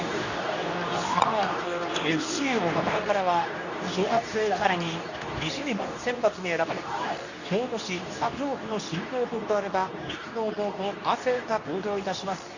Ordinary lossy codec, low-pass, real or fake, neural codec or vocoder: none; 7.2 kHz; fake; codec, 24 kHz, 0.9 kbps, WavTokenizer, medium speech release version 2